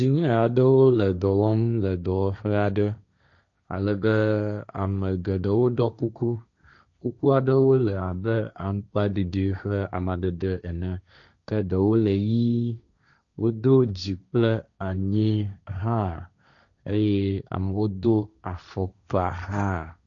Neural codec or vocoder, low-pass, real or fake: codec, 16 kHz, 1.1 kbps, Voila-Tokenizer; 7.2 kHz; fake